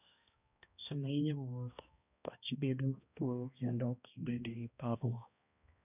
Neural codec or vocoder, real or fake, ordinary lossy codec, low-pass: codec, 16 kHz, 1 kbps, X-Codec, HuBERT features, trained on balanced general audio; fake; none; 3.6 kHz